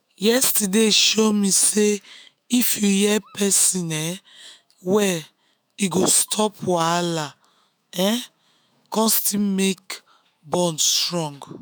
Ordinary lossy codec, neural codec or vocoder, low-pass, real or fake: none; autoencoder, 48 kHz, 128 numbers a frame, DAC-VAE, trained on Japanese speech; none; fake